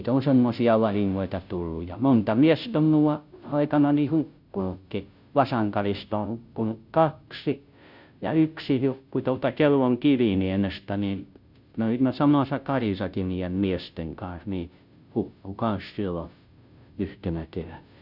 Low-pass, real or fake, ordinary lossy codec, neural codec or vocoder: 5.4 kHz; fake; none; codec, 16 kHz, 0.5 kbps, FunCodec, trained on Chinese and English, 25 frames a second